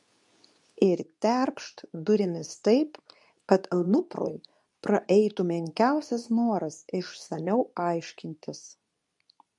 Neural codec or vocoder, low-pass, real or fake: codec, 24 kHz, 0.9 kbps, WavTokenizer, medium speech release version 2; 10.8 kHz; fake